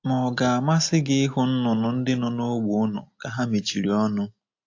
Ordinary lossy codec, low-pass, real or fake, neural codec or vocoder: AAC, 48 kbps; 7.2 kHz; real; none